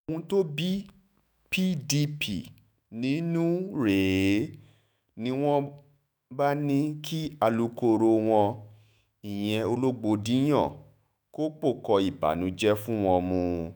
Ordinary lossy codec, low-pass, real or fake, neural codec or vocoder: none; none; fake; autoencoder, 48 kHz, 128 numbers a frame, DAC-VAE, trained on Japanese speech